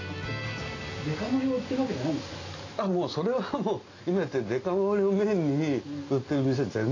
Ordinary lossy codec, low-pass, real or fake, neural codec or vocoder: AAC, 32 kbps; 7.2 kHz; real; none